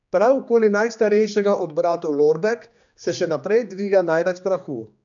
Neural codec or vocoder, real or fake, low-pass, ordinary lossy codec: codec, 16 kHz, 2 kbps, X-Codec, HuBERT features, trained on general audio; fake; 7.2 kHz; none